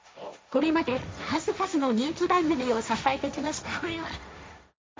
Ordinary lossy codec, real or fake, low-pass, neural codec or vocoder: none; fake; none; codec, 16 kHz, 1.1 kbps, Voila-Tokenizer